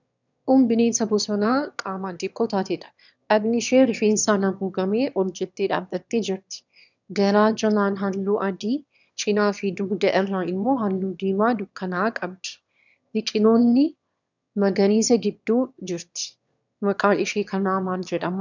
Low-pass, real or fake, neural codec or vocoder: 7.2 kHz; fake; autoencoder, 22.05 kHz, a latent of 192 numbers a frame, VITS, trained on one speaker